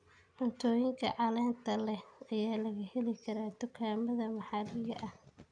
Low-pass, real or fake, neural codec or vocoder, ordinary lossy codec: 9.9 kHz; real; none; none